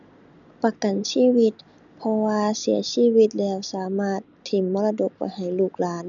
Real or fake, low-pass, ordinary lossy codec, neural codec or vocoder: real; 7.2 kHz; none; none